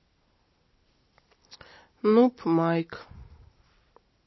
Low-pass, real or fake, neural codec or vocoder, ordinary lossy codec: 7.2 kHz; real; none; MP3, 24 kbps